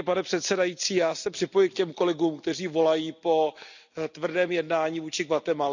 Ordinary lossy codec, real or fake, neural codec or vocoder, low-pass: none; real; none; 7.2 kHz